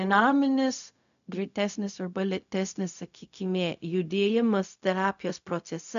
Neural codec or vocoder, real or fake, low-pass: codec, 16 kHz, 0.4 kbps, LongCat-Audio-Codec; fake; 7.2 kHz